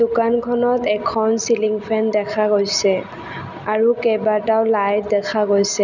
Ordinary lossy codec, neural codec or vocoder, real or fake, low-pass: none; none; real; 7.2 kHz